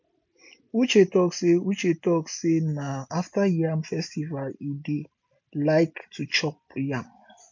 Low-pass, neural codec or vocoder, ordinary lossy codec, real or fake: 7.2 kHz; none; MP3, 48 kbps; real